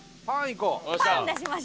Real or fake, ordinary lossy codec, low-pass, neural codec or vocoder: real; none; none; none